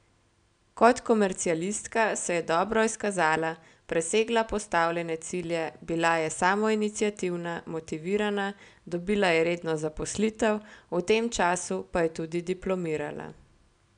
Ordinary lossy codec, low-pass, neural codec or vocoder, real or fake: none; 9.9 kHz; none; real